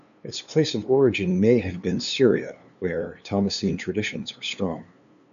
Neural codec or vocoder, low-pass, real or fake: codec, 16 kHz, 2 kbps, FunCodec, trained on LibriTTS, 25 frames a second; 7.2 kHz; fake